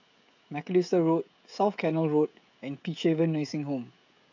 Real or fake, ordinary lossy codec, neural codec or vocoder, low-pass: fake; AAC, 48 kbps; codec, 16 kHz, 16 kbps, FreqCodec, smaller model; 7.2 kHz